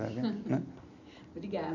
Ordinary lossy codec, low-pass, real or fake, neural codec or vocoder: none; 7.2 kHz; real; none